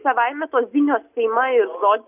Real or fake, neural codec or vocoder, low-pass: real; none; 3.6 kHz